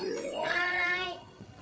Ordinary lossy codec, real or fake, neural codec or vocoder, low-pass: none; fake; codec, 16 kHz, 8 kbps, FreqCodec, larger model; none